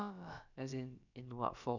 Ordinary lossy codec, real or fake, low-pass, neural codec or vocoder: none; fake; 7.2 kHz; codec, 16 kHz, about 1 kbps, DyCAST, with the encoder's durations